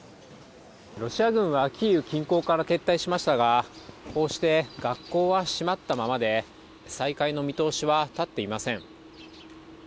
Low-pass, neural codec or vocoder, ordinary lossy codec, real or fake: none; none; none; real